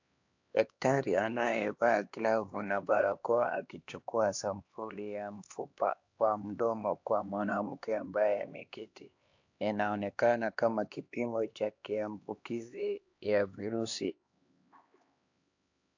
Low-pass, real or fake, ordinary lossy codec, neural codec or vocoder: 7.2 kHz; fake; AAC, 48 kbps; codec, 16 kHz, 2 kbps, X-Codec, HuBERT features, trained on LibriSpeech